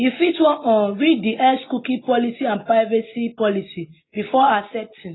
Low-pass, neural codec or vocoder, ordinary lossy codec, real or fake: 7.2 kHz; none; AAC, 16 kbps; real